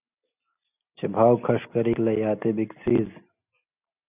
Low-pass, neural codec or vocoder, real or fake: 3.6 kHz; none; real